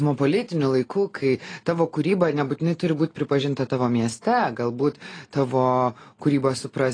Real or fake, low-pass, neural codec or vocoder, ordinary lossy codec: real; 9.9 kHz; none; AAC, 32 kbps